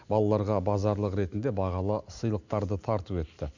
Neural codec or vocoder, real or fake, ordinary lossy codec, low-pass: none; real; none; 7.2 kHz